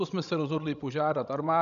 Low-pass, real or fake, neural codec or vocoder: 7.2 kHz; fake; codec, 16 kHz, 16 kbps, FreqCodec, larger model